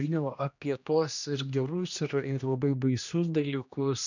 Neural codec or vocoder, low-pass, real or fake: codec, 16 kHz, 1 kbps, X-Codec, HuBERT features, trained on general audio; 7.2 kHz; fake